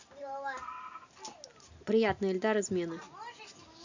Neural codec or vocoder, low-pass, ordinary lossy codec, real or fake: none; 7.2 kHz; Opus, 64 kbps; real